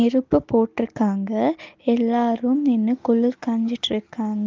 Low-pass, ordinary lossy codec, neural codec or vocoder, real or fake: 7.2 kHz; Opus, 32 kbps; none; real